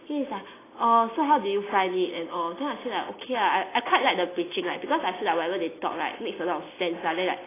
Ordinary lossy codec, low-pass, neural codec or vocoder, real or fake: AAC, 16 kbps; 3.6 kHz; none; real